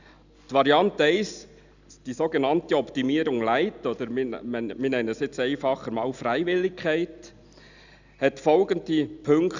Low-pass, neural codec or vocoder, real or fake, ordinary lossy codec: 7.2 kHz; none; real; none